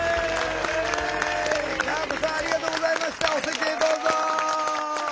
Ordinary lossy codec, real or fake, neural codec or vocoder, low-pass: none; real; none; none